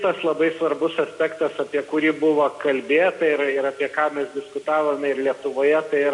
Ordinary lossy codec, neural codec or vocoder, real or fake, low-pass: MP3, 64 kbps; none; real; 10.8 kHz